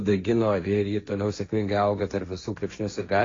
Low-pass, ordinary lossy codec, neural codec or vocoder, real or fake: 7.2 kHz; AAC, 32 kbps; codec, 16 kHz, 1.1 kbps, Voila-Tokenizer; fake